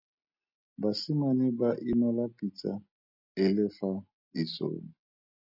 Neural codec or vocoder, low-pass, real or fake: none; 5.4 kHz; real